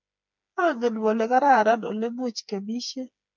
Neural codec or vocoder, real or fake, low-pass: codec, 16 kHz, 4 kbps, FreqCodec, smaller model; fake; 7.2 kHz